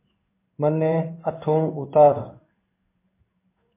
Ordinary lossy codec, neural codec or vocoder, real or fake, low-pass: MP3, 24 kbps; codec, 16 kHz in and 24 kHz out, 1 kbps, XY-Tokenizer; fake; 3.6 kHz